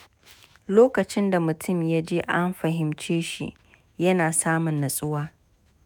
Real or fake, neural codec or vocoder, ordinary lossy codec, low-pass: fake; autoencoder, 48 kHz, 128 numbers a frame, DAC-VAE, trained on Japanese speech; none; none